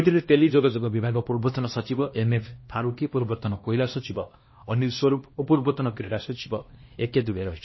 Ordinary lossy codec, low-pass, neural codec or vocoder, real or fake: MP3, 24 kbps; 7.2 kHz; codec, 16 kHz, 1 kbps, X-Codec, HuBERT features, trained on LibriSpeech; fake